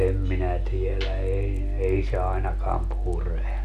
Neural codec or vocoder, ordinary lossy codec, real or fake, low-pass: none; none; real; 14.4 kHz